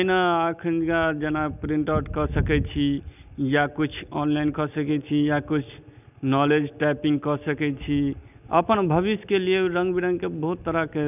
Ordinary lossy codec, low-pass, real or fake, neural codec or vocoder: none; 3.6 kHz; real; none